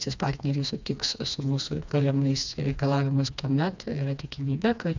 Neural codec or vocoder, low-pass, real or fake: codec, 16 kHz, 2 kbps, FreqCodec, smaller model; 7.2 kHz; fake